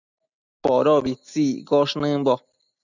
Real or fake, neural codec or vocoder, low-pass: real; none; 7.2 kHz